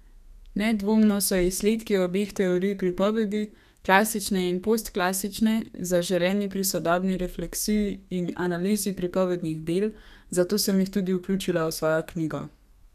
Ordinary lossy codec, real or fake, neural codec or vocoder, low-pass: none; fake; codec, 32 kHz, 1.9 kbps, SNAC; 14.4 kHz